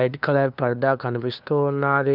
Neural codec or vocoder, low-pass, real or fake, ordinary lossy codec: codec, 16 kHz, 2 kbps, FunCodec, trained on LibriTTS, 25 frames a second; 5.4 kHz; fake; none